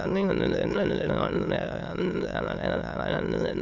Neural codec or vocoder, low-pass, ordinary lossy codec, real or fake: autoencoder, 22.05 kHz, a latent of 192 numbers a frame, VITS, trained on many speakers; 7.2 kHz; Opus, 64 kbps; fake